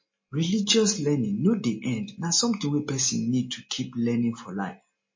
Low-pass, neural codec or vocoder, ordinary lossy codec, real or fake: 7.2 kHz; none; MP3, 32 kbps; real